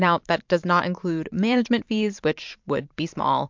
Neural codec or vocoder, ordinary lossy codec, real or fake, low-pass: vocoder, 44.1 kHz, 128 mel bands every 512 samples, BigVGAN v2; MP3, 64 kbps; fake; 7.2 kHz